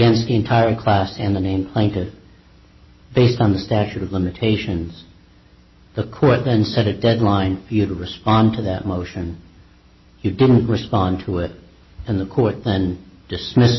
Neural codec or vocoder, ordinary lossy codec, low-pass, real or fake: none; MP3, 24 kbps; 7.2 kHz; real